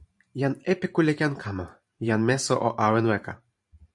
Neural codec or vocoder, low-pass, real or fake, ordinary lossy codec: vocoder, 44.1 kHz, 128 mel bands every 512 samples, BigVGAN v2; 10.8 kHz; fake; MP3, 64 kbps